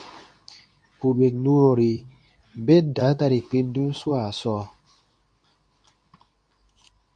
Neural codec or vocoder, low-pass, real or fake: codec, 24 kHz, 0.9 kbps, WavTokenizer, medium speech release version 2; 9.9 kHz; fake